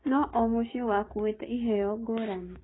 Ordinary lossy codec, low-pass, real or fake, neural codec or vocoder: AAC, 16 kbps; 7.2 kHz; fake; codec, 16 kHz, 6 kbps, DAC